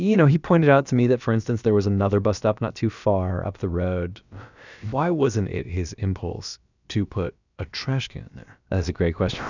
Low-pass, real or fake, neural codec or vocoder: 7.2 kHz; fake; codec, 16 kHz, about 1 kbps, DyCAST, with the encoder's durations